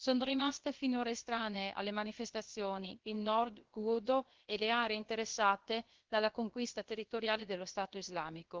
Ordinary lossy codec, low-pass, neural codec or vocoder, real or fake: Opus, 16 kbps; 7.2 kHz; codec, 16 kHz, about 1 kbps, DyCAST, with the encoder's durations; fake